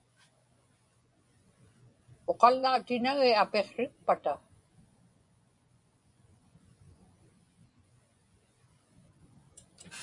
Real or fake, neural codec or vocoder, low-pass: fake; vocoder, 24 kHz, 100 mel bands, Vocos; 10.8 kHz